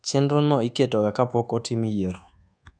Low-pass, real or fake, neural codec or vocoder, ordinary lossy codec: 9.9 kHz; fake; codec, 24 kHz, 1.2 kbps, DualCodec; none